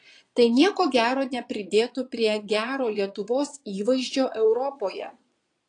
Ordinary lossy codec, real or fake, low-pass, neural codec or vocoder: AAC, 48 kbps; fake; 9.9 kHz; vocoder, 22.05 kHz, 80 mel bands, Vocos